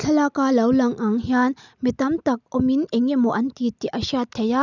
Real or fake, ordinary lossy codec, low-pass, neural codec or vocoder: real; none; 7.2 kHz; none